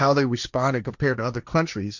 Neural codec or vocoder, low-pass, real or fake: codec, 16 kHz, 1.1 kbps, Voila-Tokenizer; 7.2 kHz; fake